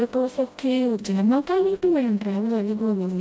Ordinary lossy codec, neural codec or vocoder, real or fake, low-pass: none; codec, 16 kHz, 0.5 kbps, FreqCodec, smaller model; fake; none